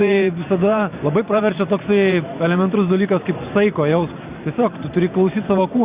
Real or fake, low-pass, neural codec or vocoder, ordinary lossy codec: fake; 3.6 kHz; vocoder, 44.1 kHz, 128 mel bands every 512 samples, BigVGAN v2; Opus, 64 kbps